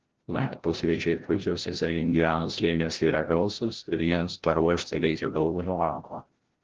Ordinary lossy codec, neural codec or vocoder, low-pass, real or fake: Opus, 16 kbps; codec, 16 kHz, 0.5 kbps, FreqCodec, larger model; 7.2 kHz; fake